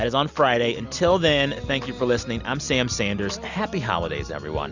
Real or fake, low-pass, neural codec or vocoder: real; 7.2 kHz; none